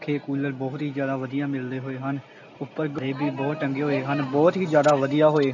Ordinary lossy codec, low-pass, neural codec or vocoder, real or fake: none; 7.2 kHz; none; real